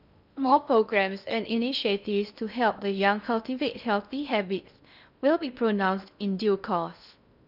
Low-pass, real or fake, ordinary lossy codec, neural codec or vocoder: 5.4 kHz; fake; none; codec, 16 kHz in and 24 kHz out, 0.6 kbps, FocalCodec, streaming, 2048 codes